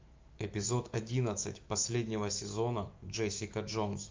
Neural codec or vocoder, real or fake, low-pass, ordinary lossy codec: autoencoder, 48 kHz, 128 numbers a frame, DAC-VAE, trained on Japanese speech; fake; 7.2 kHz; Opus, 24 kbps